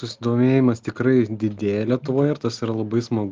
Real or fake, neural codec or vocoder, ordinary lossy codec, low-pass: real; none; Opus, 32 kbps; 7.2 kHz